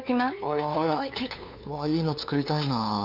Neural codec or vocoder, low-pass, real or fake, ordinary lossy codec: codec, 16 kHz, 2 kbps, FunCodec, trained on LibriTTS, 25 frames a second; 5.4 kHz; fake; none